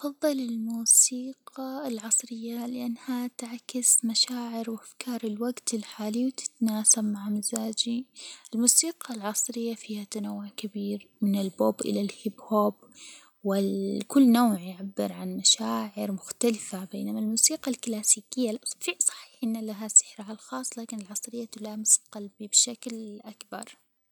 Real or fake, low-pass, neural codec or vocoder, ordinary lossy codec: real; none; none; none